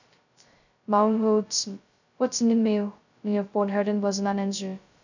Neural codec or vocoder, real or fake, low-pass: codec, 16 kHz, 0.2 kbps, FocalCodec; fake; 7.2 kHz